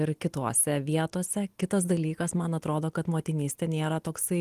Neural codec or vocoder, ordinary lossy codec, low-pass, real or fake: none; Opus, 24 kbps; 14.4 kHz; real